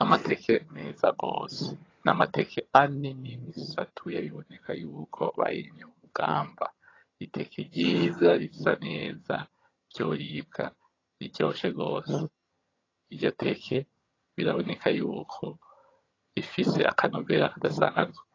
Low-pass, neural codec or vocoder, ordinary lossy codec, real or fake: 7.2 kHz; vocoder, 22.05 kHz, 80 mel bands, HiFi-GAN; AAC, 32 kbps; fake